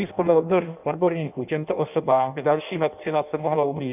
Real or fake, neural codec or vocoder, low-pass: fake; codec, 16 kHz in and 24 kHz out, 0.6 kbps, FireRedTTS-2 codec; 3.6 kHz